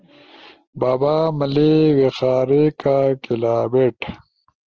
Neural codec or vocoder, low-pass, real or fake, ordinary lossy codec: none; 7.2 kHz; real; Opus, 16 kbps